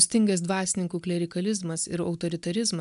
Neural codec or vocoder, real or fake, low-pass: none; real; 10.8 kHz